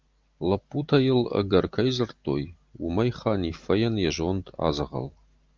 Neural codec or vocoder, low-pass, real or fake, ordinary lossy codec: none; 7.2 kHz; real; Opus, 32 kbps